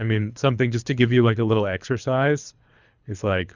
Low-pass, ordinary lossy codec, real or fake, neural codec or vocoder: 7.2 kHz; Opus, 64 kbps; fake; codec, 24 kHz, 3 kbps, HILCodec